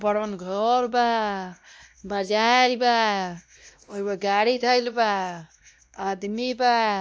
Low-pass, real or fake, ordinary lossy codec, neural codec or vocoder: none; fake; none; codec, 16 kHz, 1 kbps, X-Codec, WavLM features, trained on Multilingual LibriSpeech